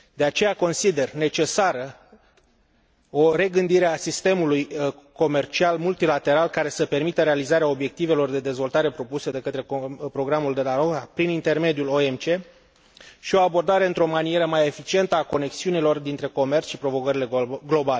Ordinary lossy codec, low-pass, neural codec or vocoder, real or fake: none; none; none; real